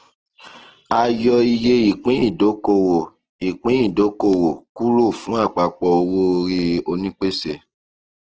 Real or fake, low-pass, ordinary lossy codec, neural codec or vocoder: real; 7.2 kHz; Opus, 16 kbps; none